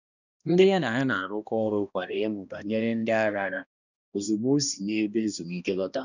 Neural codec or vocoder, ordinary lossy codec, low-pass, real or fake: codec, 16 kHz, 1 kbps, X-Codec, HuBERT features, trained on balanced general audio; none; 7.2 kHz; fake